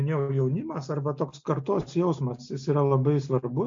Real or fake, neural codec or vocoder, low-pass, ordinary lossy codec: real; none; 7.2 kHz; MP3, 48 kbps